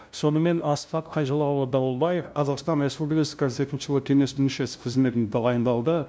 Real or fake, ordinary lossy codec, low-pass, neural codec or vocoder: fake; none; none; codec, 16 kHz, 0.5 kbps, FunCodec, trained on LibriTTS, 25 frames a second